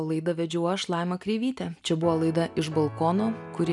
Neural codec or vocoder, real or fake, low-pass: none; real; 10.8 kHz